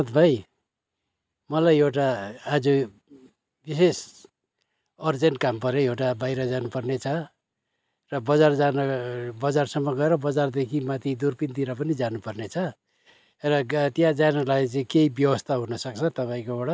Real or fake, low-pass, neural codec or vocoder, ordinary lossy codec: real; none; none; none